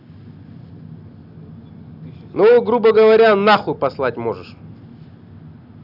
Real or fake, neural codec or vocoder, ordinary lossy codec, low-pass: real; none; none; 5.4 kHz